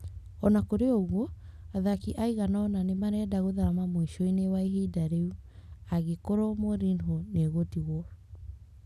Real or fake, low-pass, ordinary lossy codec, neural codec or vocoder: real; 14.4 kHz; none; none